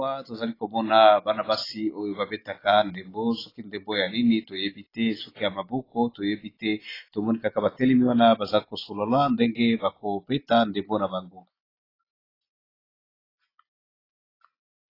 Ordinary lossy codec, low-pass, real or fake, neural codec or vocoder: AAC, 24 kbps; 5.4 kHz; real; none